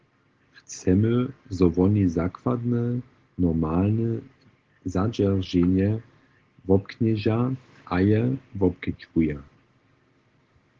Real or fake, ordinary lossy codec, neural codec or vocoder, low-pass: real; Opus, 16 kbps; none; 7.2 kHz